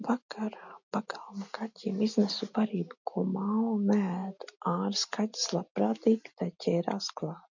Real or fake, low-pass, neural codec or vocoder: real; 7.2 kHz; none